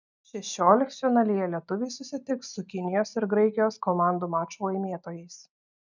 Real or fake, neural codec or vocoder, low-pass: real; none; 7.2 kHz